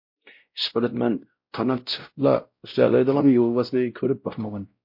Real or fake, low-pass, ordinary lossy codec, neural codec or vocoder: fake; 5.4 kHz; MP3, 32 kbps; codec, 16 kHz, 0.5 kbps, X-Codec, WavLM features, trained on Multilingual LibriSpeech